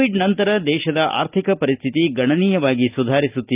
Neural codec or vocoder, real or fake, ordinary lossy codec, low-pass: none; real; Opus, 24 kbps; 3.6 kHz